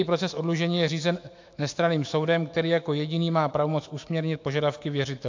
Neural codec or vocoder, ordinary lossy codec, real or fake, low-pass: autoencoder, 48 kHz, 128 numbers a frame, DAC-VAE, trained on Japanese speech; AAC, 48 kbps; fake; 7.2 kHz